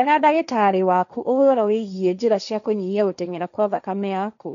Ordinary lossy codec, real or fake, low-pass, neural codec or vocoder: none; fake; 7.2 kHz; codec, 16 kHz, 1.1 kbps, Voila-Tokenizer